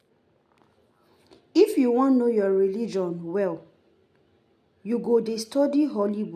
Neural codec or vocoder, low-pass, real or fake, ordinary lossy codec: none; 14.4 kHz; real; none